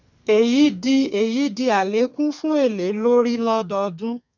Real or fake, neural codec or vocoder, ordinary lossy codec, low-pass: fake; codec, 32 kHz, 1.9 kbps, SNAC; none; 7.2 kHz